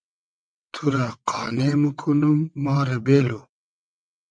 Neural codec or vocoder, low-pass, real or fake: vocoder, 22.05 kHz, 80 mel bands, WaveNeXt; 9.9 kHz; fake